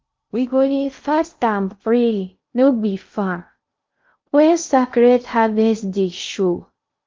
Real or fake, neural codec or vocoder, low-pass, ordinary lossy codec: fake; codec, 16 kHz in and 24 kHz out, 0.6 kbps, FocalCodec, streaming, 2048 codes; 7.2 kHz; Opus, 24 kbps